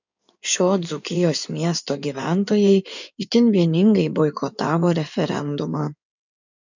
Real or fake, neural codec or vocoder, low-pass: fake; codec, 16 kHz in and 24 kHz out, 2.2 kbps, FireRedTTS-2 codec; 7.2 kHz